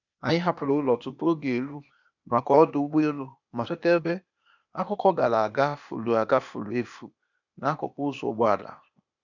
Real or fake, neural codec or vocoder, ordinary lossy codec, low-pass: fake; codec, 16 kHz, 0.8 kbps, ZipCodec; none; 7.2 kHz